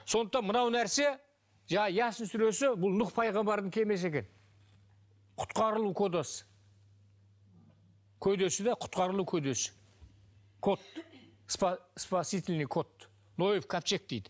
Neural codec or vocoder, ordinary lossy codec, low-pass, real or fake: none; none; none; real